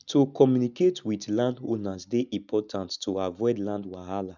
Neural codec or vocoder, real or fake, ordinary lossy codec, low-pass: none; real; none; 7.2 kHz